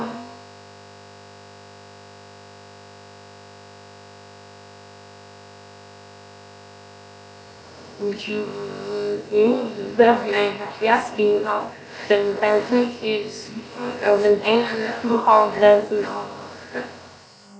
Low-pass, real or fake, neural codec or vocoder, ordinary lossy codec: none; fake; codec, 16 kHz, about 1 kbps, DyCAST, with the encoder's durations; none